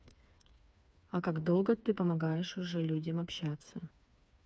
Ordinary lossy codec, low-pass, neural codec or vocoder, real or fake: none; none; codec, 16 kHz, 4 kbps, FreqCodec, smaller model; fake